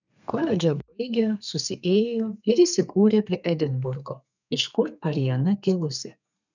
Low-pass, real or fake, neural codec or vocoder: 7.2 kHz; fake; codec, 32 kHz, 1.9 kbps, SNAC